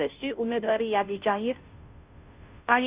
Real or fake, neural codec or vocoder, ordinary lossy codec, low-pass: fake; codec, 16 kHz, 0.5 kbps, FunCodec, trained on Chinese and English, 25 frames a second; Opus, 64 kbps; 3.6 kHz